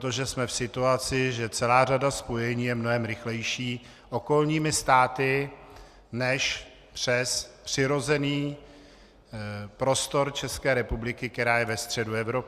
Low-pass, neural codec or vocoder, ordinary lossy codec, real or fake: 14.4 kHz; none; Opus, 64 kbps; real